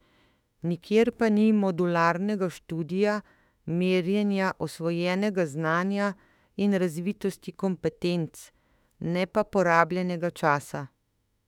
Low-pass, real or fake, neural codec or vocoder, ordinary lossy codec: 19.8 kHz; fake; autoencoder, 48 kHz, 32 numbers a frame, DAC-VAE, trained on Japanese speech; none